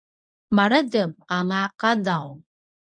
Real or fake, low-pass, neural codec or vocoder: fake; 9.9 kHz; codec, 24 kHz, 0.9 kbps, WavTokenizer, medium speech release version 1